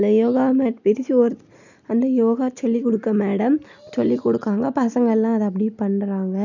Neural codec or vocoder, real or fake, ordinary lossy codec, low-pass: none; real; none; 7.2 kHz